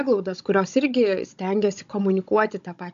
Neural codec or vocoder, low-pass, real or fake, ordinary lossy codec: codec, 16 kHz, 16 kbps, FunCodec, trained on Chinese and English, 50 frames a second; 7.2 kHz; fake; AAC, 64 kbps